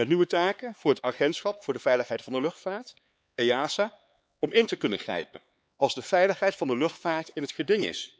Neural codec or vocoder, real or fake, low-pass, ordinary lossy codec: codec, 16 kHz, 4 kbps, X-Codec, HuBERT features, trained on LibriSpeech; fake; none; none